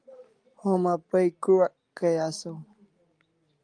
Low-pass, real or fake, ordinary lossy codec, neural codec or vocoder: 9.9 kHz; real; Opus, 32 kbps; none